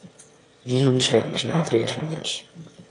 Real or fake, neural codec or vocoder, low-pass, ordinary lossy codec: fake; autoencoder, 22.05 kHz, a latent of 192 numbers a frame, VITS, trained on one speaker; 9.9 kHz; AAC, 48 kbps